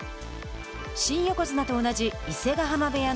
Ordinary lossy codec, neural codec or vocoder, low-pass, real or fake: none; none; none; real